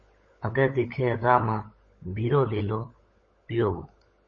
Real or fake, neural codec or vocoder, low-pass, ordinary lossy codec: fake; codec, 16 kHz, 16 kbps, FunCodec, trained on LibriTTS, 50 frames a second; 7.2 kHz; MP3, 32 kbps